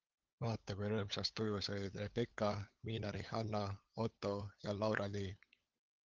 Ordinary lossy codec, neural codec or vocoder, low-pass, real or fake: Opus, 32 kbps; codec, 16 kHz, 8 kbps, FunCodec, trained on LibriTTS, 25 frames a second; 7.2 kHz; fake